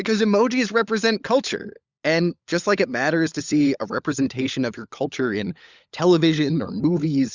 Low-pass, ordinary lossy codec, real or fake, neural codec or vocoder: 7.2 kHz; Opus, 64 kbps; fake; codec, 16 kHz, 16 kbps, FunCodec, trained on LibriTTS, 50 frames a second